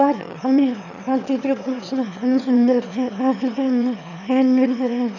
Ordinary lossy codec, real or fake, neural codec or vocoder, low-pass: none; fake; autoencoder, 22.05 kHz, a latent of 192 numbers a frame, VITS, trained on one speaker; 7.2 kHz